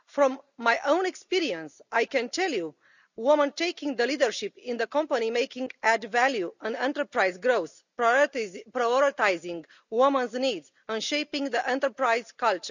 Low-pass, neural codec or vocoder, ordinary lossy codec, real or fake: 7.2 kHz; none; none; real